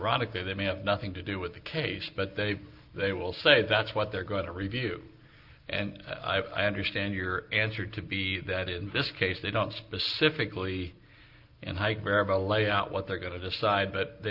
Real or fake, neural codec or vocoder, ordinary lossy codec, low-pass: real; none; Opus, 24 kbps; 5.4 kHz